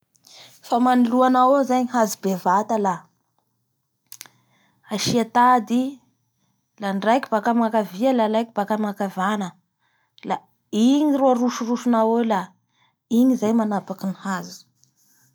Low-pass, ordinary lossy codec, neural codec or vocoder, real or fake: none; none; none; real